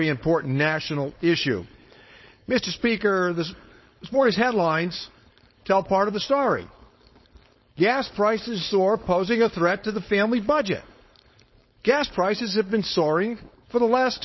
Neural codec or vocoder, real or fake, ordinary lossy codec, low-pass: codec, 16 kHz, 4.8 kbps, FACodec; fake; MP3, 24 kbps; 7.2 kHz